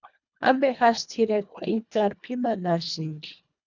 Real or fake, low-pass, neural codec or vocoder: fake; 7.2 kHz; codec, 24 kHz, 1.5 kbps, HILCodec